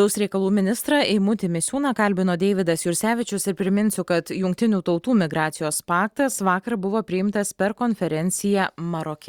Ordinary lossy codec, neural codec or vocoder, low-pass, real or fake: Opus, 64 kbps; none; 19.8 kHz; real